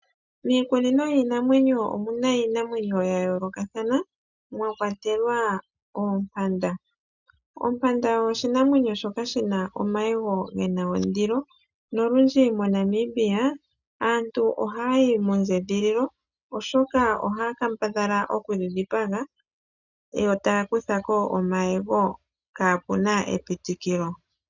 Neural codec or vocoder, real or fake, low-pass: none; real; 7.2 kHz